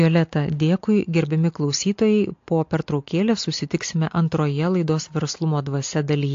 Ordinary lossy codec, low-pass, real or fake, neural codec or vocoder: AAC, 48 kbps; 7.2 kHz; real; none